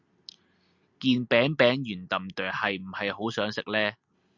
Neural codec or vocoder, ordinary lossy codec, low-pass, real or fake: none; Opus, 64 kbps; 7.2 kHz; real